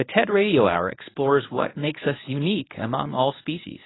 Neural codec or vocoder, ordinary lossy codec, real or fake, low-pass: codec, 24 kHz, 0.9 kbps, WavTokenizer, medium speech release version 1; AAC, 16 kbps; fake; 7.2 kHz